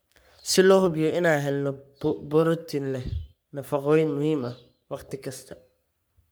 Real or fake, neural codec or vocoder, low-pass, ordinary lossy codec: fake; codec, 44.1 kHz, 3.4 kbps, Pupu-Codec; none; none